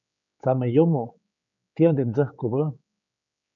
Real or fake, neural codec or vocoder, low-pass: fake; codec, 16 kHz, 4 kbps, X-Codec, HuBERT features, trained on general audio; 7.2 kHz